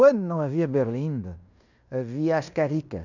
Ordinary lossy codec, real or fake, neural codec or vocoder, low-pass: none; fake; codec, 16 kHz in and 24 kHz out, 0.9 kbps, LongCat-Audio-Codec, fine tuned four codebook decoder; 7.2 kHz